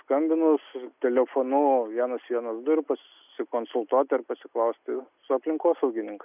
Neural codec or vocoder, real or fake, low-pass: none; real; 3.6 kHz